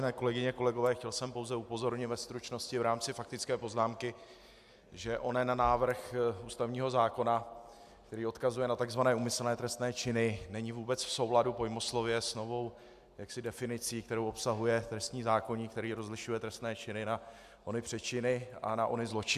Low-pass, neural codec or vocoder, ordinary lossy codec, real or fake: 14.4 kHz; none; AAC, 96 kbps; real